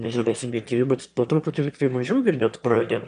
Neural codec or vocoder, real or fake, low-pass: autoencoder, 22.05 kHz, a latent of 192 numbers a frame, VITS, trained on one speaker; fake; 9.9 kHz